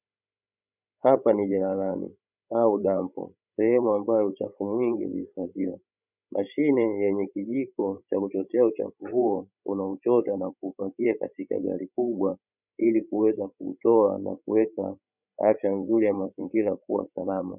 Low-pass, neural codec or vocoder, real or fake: 3.6 kHz; codec, 16 kHz, 16 kbps, FreqCodec, larger model; fake